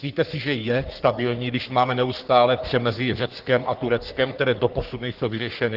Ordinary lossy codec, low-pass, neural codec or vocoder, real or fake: Opus, 16 kbps; 5.4 kHz; codec, 44.1 kHz, 3.4 kbps, Pupu-Codec; fake